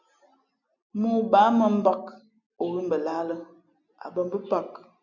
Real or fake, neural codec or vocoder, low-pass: real; none; 7.2 kHz